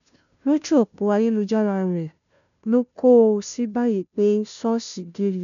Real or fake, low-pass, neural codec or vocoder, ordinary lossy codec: fake; 7.2 kHz; codec, 16 kHz, 0.5 kbps, FunCodec, trained on Chinese and English, 25 frames a second; none